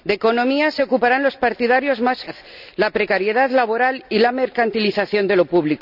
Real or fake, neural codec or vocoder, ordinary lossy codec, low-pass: real; none; none; 5.4 kHz